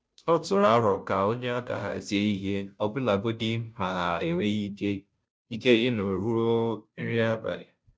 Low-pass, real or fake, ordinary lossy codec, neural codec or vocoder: none; fake; none; codec, 16 kHz, 0.5 kbps, FunCodec, trained on Chinese and English, 25 frames a second